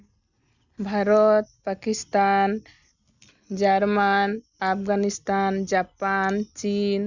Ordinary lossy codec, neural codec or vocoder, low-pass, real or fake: none; none; 7.2 kHz; real